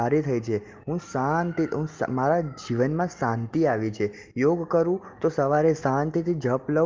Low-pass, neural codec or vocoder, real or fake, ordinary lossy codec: 7.2 kHz; none; real; Opus, 32 kbps